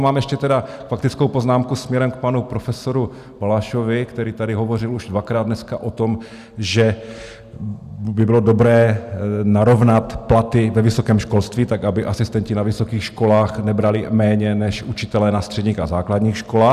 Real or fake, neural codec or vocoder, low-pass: real; none; 14.4 kHz